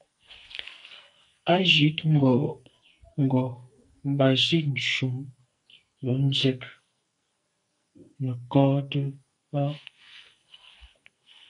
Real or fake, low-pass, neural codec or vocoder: fake; 10.8 kHz; codec, 44.1 kHz, 2.6 kbps, SNAC